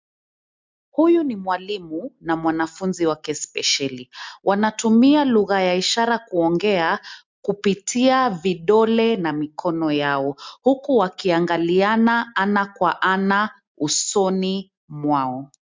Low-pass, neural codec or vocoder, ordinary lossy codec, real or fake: 7.2 kHz; none; MP3, 64 kbps; real